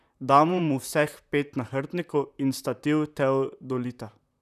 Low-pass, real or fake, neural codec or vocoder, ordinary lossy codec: 14.4 kHz; fake; vocoder, 44.1 kHz, 128 mel bands, Pupu-Vocoder; none